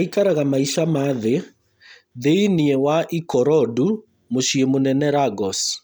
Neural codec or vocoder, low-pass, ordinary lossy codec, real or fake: none; none; none; real